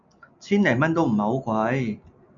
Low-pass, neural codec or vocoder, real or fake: 7.2 kHz; none; real